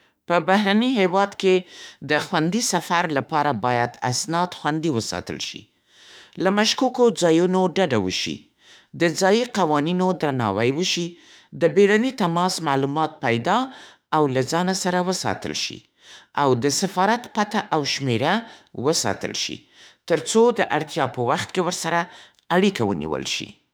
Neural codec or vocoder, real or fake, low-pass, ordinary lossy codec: autoencoder, 48 kHz, 32 numbers a frame, DAC-VAE, trained on Japanese speech; fake; none; none